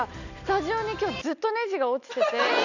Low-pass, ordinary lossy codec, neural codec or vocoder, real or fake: 7.2 kHz; none; none; real